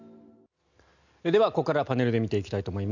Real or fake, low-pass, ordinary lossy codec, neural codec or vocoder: real; 7.2 kHz; none; none